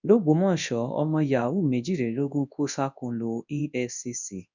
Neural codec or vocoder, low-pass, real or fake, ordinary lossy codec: codec, 24 kHz, 0.9 kbps, WavTokenizer, large speech release; 7.2 kHz; fake; none